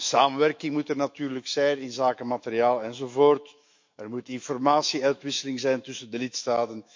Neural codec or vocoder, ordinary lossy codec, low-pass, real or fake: autoencoder, 48 kHz, 128 numbers a frame, DAC-VAE, trained on Japanese speech; MP3, 48 kbps; 7.2 kHz; fake